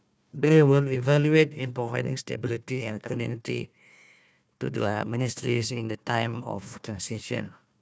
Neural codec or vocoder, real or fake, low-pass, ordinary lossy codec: codec, 16 kHz, 1 kbps, FunCodec, trained on Chinese and English, 50 frames a second; fake; none; none